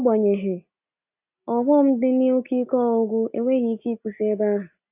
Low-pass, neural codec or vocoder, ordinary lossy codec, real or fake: 3.6 kHz; none; AAC, 32 kbps; real